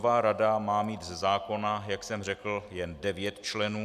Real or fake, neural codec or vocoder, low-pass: real; none; 14.4 kHz